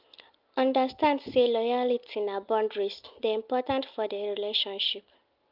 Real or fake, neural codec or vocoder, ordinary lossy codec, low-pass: real; none; Opus, 24 kbps; 5.4 kHz